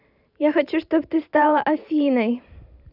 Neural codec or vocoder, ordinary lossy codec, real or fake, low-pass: vocoder, 44.1 kHz, 128 mel bands every 512 samples, BigVGAN v2; none; fake; 5.4 kHz